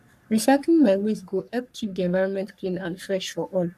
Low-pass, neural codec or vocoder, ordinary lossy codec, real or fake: 14.4 kHz; codec, 44.1 kHz, 3.4 kbps, Pupu-Codec; none; fake